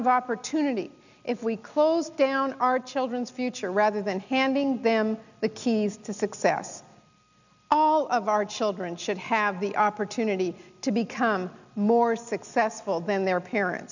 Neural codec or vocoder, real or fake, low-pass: none; real; 7.2 kHz